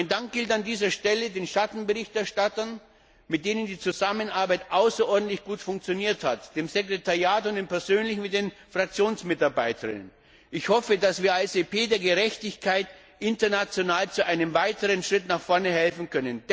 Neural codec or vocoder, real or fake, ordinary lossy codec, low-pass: none; real; none; none